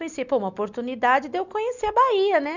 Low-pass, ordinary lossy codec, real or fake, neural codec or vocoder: 7.2 kHz; none; real; none